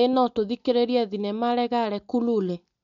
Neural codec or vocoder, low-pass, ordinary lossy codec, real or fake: none; 7.2 kHz; none; real